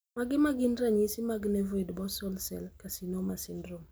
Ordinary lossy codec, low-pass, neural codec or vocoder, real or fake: none; none; none; real